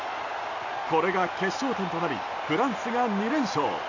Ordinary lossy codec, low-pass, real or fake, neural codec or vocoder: none; 7.2 kHz; real; none